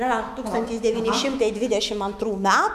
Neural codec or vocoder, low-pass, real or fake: autoencoder, 48 kHz, 128 numbers a frame, DAC-VAE, trained on Japanese speech; 14.4 kHz; fake